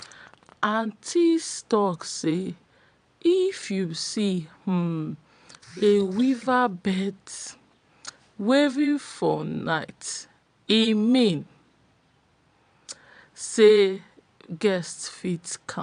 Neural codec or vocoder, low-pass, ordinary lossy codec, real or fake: vocoder, 22.05 kHz, 80 mel bands, Vocos; 9.9 kHz; AAC, 96 kbps; fake